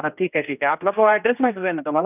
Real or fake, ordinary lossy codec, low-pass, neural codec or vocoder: fake; none; 3.6 kHz; codec, 16 kHz, 1 kbps, X-Codec, HuBERT features, trained on general audio